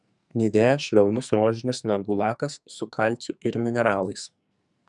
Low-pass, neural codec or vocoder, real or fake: 10.8 kHz; codec, 44.1 kHz, 2.6 kbps, SNAC; fake